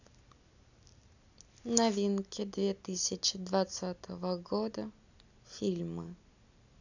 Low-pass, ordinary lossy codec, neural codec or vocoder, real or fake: 7.2 kHz; none; none; real